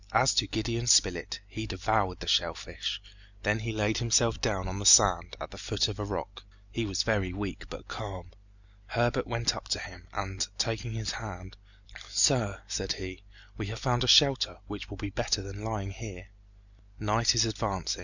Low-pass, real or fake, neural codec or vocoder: 7.2 kHz; real; none